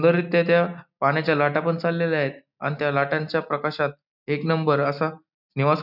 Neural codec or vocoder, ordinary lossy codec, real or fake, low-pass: none; none; real; 5.4 kHz